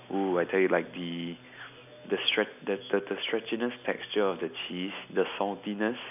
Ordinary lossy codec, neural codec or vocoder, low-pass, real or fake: none; none; 3.6 kHz; real